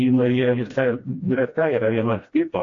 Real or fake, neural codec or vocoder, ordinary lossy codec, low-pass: fake; codec, 16 kHz, 1 kbps, FreqCodec, smaller model; AAC, 48 kbps; 7.2 kHz